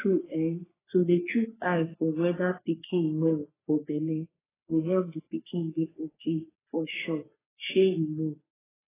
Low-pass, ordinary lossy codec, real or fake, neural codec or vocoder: 3.6 kHz; AAC, 16 kbps; fake; codec, 44.1 kHz, 3.4 kbps, Pupu-Codec